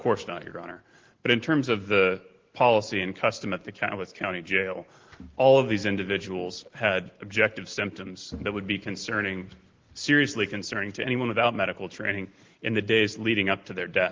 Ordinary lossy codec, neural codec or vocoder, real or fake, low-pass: Opus, 16 kbps; none; real; 7.2 kHz